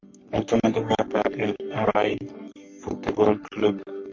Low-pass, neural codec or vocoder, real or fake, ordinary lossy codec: 7.2 kHz; codec, 44.1 kHz, 3.4 kbps, Pupu-Codec; fake; MP3, 48 kbps